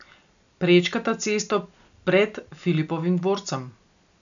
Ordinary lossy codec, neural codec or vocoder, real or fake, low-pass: none; none; real; 7.2 kHz